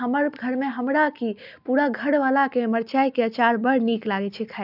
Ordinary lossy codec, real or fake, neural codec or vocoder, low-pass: none; real; none; 5.4 kHz